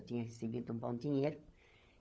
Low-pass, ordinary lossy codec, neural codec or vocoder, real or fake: none; none; codec, 16 kHz, 16 kbps, FunCodec, trained on LibriTTS, 50 frames a second; fake